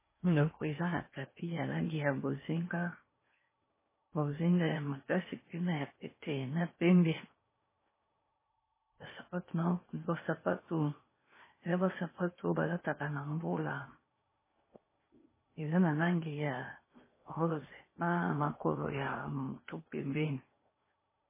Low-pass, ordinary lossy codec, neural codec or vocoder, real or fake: 3.6 kHz; MP3, 16 kbps; codec, 16 kHz in and 24 kHz out, 0.8 kbps, FocalCodec, streaming, 65536 codes; fake